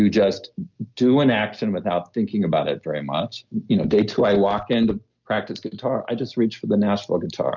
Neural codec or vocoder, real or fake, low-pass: none; real; 7.2 kHz